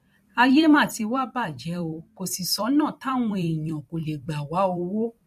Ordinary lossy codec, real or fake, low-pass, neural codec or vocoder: MP3, 64 kbps; fake; 14.4 kHz; vocoder, 44.1 kHz, 128 mel bands every 256 samples, BigVGAN v2